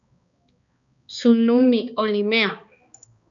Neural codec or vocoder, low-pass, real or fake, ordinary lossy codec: codec, 16 kHz, 2 kbps, X-Codec, HuBERT features, trained on balanced general audio; 7.2 kHz; fake; MP3, 64 kbps